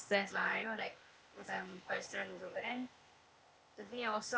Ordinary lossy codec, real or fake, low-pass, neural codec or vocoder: none; fake; none; codec, 16 kHz, 0.8 kbps, ZipCodec